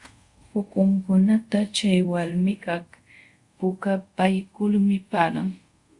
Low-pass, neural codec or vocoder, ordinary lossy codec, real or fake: 10.8 kHz; codec, 24 kHz, 0.5 kbps, DualCodec; Opus, 64 kbps; fake